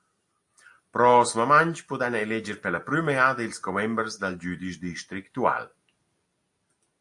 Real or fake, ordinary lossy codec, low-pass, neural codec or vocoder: real; AAC, 64 kbps; 10.8 kHz; none